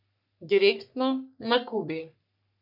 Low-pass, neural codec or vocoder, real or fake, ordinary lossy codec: 5.4 kHz; codec, 44.1 kHz, 3.4 kbps, Pupu-Codec; fake; none